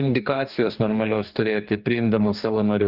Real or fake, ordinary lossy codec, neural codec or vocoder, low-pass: fake; Opus, 32 kbps; codec, 44.1 kHz, 2.6 kbps, DAC; 5.4 kHz